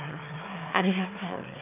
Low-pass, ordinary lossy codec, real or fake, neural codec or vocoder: 3.6 kHz; none; fake; autoencoder, 22.05 kHz, a latent of 192 numbers a frame, VITS, trained on one speaker